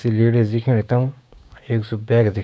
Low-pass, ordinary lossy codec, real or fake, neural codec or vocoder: none; none; fake; codec, 16 kHz, 6 kbps, DAC